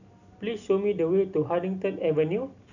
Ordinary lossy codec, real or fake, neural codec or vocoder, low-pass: none; real; none; 7.2 kHz